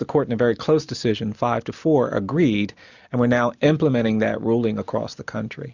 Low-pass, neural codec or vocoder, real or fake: 7.2 kHz; none; real